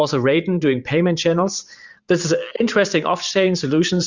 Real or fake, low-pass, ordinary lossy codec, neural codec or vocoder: fake; 7.2 kHz; Opus, 64 kbps; vocoder, 44.1 kHz, 128 mel bands every 512 samples, BigVGAN v2